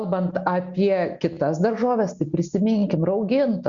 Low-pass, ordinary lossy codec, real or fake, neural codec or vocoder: 7.2 kHz; Opus, 64 kbps; real; none